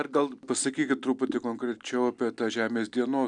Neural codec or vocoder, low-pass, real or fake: none; 9.9 kHz; real